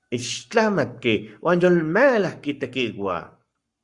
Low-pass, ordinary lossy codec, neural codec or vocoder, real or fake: 10.8 kHz; Opus, 64 kbps; codec, 44.1 kHz, 7.8 kbps, Pupu-Codec; fake